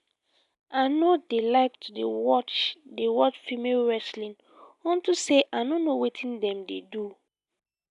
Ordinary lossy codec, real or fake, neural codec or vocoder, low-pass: none; real; none; 10.8 kHz